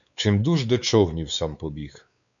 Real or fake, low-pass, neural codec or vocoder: fake; 7.2 kHz; codec, 16 kHz, 4 kbps, X-Codec, WavLM features, trained on Multilingual LibriSpeech